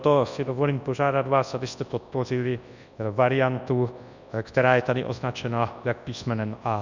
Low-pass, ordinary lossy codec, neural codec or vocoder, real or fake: 7.2 kHz; Opus, 64 kbps; codec, 24 kHz, 0.9 kbps, WavTokenizer, large speech release; fake